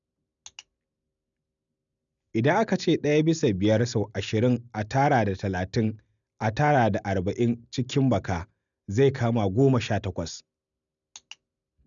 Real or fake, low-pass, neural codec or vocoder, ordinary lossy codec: real; 7.2 kHz; none; none